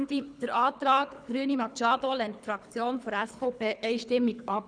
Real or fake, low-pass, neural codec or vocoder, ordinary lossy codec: fake; 9.9 kHz; codec, 24 kHz, 3 kbps, HILCodec; none